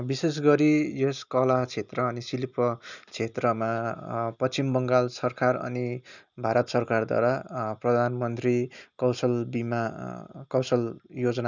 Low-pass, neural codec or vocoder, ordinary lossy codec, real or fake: 7.2 kHz; none; none; real